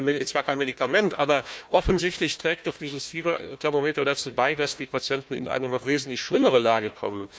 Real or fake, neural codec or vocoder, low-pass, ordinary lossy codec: fake; codec, 16 kHz, 1 kbps, FunCodec, trained on Chinese and English, 50 frames a second; none; none